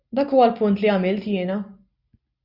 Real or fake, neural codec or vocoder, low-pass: real; none; 5.4 kHz